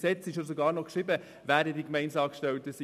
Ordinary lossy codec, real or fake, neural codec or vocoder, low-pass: none; real; none; 14.4 kHz